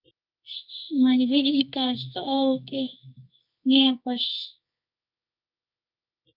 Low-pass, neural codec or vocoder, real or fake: 5.4 kHz; codec, 24 kHz, 0.9 kbps, WavTokenizer, medium music audio release; fake